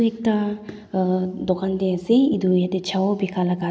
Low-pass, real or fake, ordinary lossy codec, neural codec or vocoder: none; real; none; none